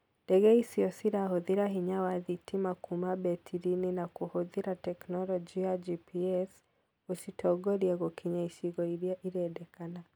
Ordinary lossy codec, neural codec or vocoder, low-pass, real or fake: none; none; none; real